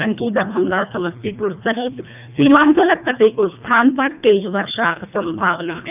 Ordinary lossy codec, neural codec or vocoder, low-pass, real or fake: none; codec, 24 kHz, 1.5 kbps, HILCodec; 3.6 kHz; fake